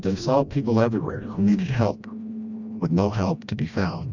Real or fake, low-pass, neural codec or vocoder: fake; 7.2 kHz; codec, 16 kHz, 1 kbps, FreqCodec, smaller model